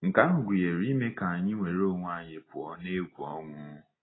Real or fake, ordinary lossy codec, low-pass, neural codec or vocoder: real; AAC, 16 kbps; 7.2 kHz; none